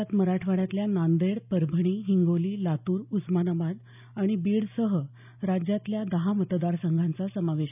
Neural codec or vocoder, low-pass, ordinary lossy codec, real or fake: none; 3.6 kHz; none; real